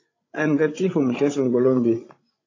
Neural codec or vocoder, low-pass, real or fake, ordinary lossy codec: codec, 16 kHz, 16 kbps, FreqCodec, larger model; 7.2 kHz; fake; AAC, 32 kbps